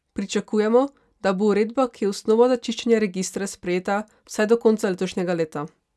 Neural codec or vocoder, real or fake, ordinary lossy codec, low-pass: none; real; none; none